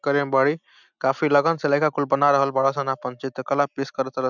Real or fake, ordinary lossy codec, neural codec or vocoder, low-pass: real; none; none; 7.2 kHz